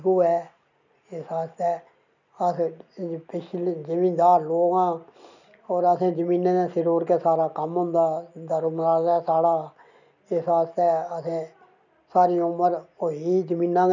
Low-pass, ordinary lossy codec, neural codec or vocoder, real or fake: 7.2 kHz; none; none; real